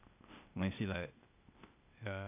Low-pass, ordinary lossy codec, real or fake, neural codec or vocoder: 3.6 kHz; none; fake; codec, 16 kHz, 0.8 kbps, ZipCodec